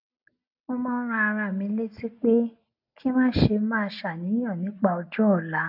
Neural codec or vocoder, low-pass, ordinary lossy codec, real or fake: none; 5.4 kHz; AAC, 48 kbps; real